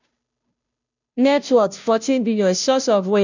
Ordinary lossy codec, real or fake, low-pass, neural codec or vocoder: none; fake; 7.2 kHz; codec, 16 kHz, 0.5 kbps, FunCodec, trained on Chinese and English, 25 frames a second